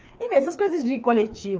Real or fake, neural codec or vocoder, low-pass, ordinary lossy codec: fake; codec, 16 kHz, 2 kbps, FreqCodec, larger model; 7.2 kHz; Opus, 24 kbps